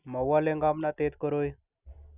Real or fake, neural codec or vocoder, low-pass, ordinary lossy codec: real; none; 3.6 kHz; none